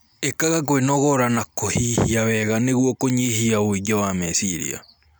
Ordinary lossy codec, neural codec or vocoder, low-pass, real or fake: none; none; none; real